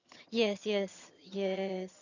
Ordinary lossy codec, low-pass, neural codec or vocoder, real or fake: none; 7.2 kHz; vocoder, 22.05 kHz, 80 mel bands, HiFi-GAN; fake